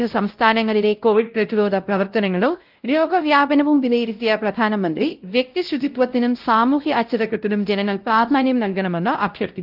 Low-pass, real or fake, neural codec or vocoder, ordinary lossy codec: 5.4 kHz; fake; codec, 16 kHz, 0.5 kbps, X-Codec, WavLM features, trained on Multilingual LibriSpeech; Opus, 32 kbps